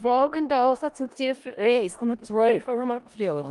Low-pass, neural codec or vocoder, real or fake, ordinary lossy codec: 10.8 kHz; codec, 16 kHz in and 24 kHz out, 0.4 kbps, LongCat-Audio-Codec, four codebook decoder; fake; Opus, 32 kbps